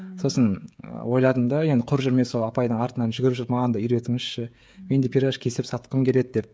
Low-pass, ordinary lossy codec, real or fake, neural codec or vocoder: none; none; fake; codec, 16 kHz, 16 kbps, FreqCodec, smaller model